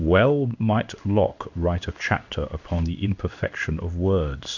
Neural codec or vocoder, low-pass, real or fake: codec, 16 kHz in and 24 kHz out, 1 kbps, XY-Tokenizer; 7.2 kHz; fake